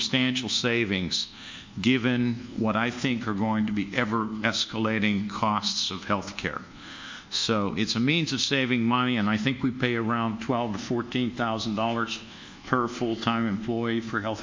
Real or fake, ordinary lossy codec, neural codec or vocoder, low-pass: fake; MP3, 64 kbps; codec, 24 kHz, 1.2 kbps, DualCodec; 7.2 kHz